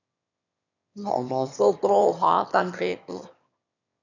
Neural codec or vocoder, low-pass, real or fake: autoencoder, 22.05 kHz, a latent of 192 numbers a frame, VITS, trained on one speaker; 7.2 kHz; fake